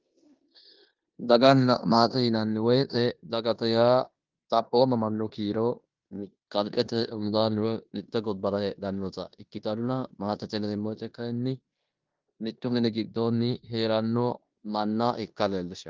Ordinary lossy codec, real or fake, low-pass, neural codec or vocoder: Opus, 24 kbps; fake; 7.2 kHz; codec, 16 kHz in and 24 kHz out, 0.9 kbps, LongCat-Audio-Codec, four codebook decoder